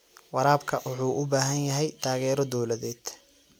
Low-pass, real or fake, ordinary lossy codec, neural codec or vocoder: none; real; none; none